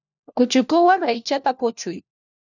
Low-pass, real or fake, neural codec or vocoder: 7.2 kHz; fake; codec, 16 kHz, 1 kbps, FunCodec, trained on LibriTTS, 50 frames a second